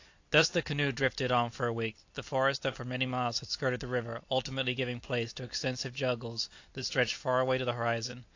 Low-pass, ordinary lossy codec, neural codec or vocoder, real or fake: 7.2 kHz; AAC, 48 kbps; none; real